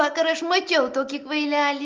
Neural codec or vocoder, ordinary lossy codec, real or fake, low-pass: none; Opus, 32 kbps; real; 7.2 kHz